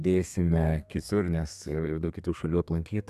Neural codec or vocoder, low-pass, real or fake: codec, 32 kHz, 1.9 kbps, SNAC; 14.4 kHz; fake